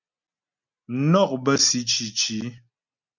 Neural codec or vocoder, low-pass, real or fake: none; 7.2 kHz; real